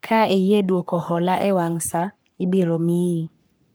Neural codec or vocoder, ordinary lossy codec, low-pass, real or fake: codec, 44.1 kHz, 3.4 kbps, Pupu-Codec; none; none; fake